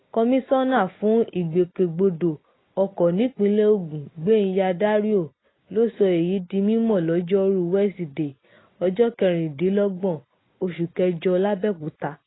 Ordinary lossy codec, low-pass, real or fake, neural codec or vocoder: AAC, 16 kbps; 7.2 kHz; real; none